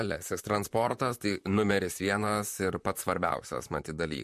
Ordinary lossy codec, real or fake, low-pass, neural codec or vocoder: MP3, 64 kbps; fake; 14.4 kHz; vocoder, 44.1 kHz, 128 mel bands, Pupu-Vocoder